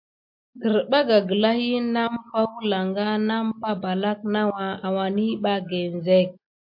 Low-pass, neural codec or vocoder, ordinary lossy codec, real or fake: 5.4 kHz; none; MP3, 48 kbps; real